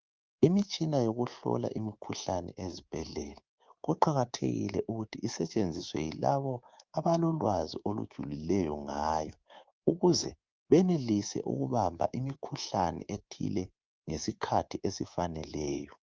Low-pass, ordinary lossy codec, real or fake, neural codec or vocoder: 7.2 kHz; Opus, 32 kbps; fake; codec, 24 kHz, 3.1 kbps, DualCodec